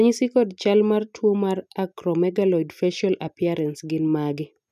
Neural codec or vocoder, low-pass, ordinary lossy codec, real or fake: none; 14.4 kHz; none; real